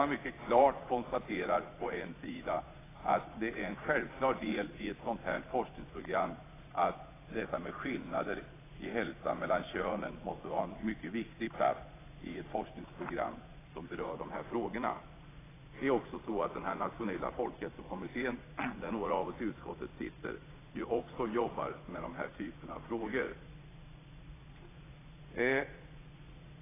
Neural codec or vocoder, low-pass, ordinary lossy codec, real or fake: vocoder, 22.05 kHz, 80 mel bands, Vocos; 3.6 kHz; AAC, 16 kbps; fake